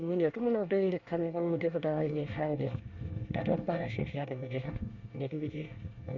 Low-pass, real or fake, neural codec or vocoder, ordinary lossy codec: 7.2 kHz; fake; codec, 24 kHz, 1 kbps, SNAC; Opus, 64 kbps